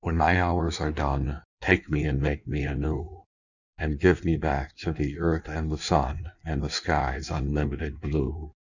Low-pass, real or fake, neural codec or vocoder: 7.2 kHz; fake; codec, 16 kHz in and 24 kHz out, 1.1 kbps, FireRedTTS-2 codec